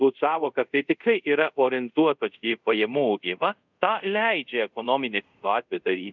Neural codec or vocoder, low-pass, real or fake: codec, 24 kHz, 0.5 kbps, DualCodec; 7.2 kHz; fake